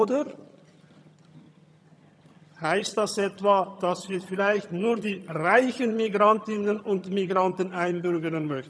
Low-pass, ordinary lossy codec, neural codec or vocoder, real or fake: none; none; vocoder, 22.05 kHz, 80 mel bands, HiFi-GAN; fake